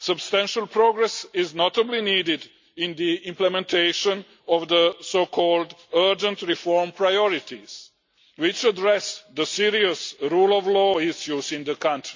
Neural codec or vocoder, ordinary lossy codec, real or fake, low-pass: none; MP3, 64 kbps; real; 7.2 kHz